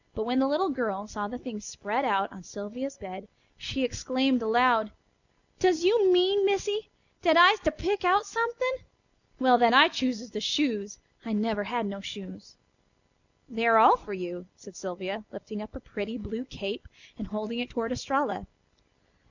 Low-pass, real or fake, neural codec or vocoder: 7.2 kHz; real; none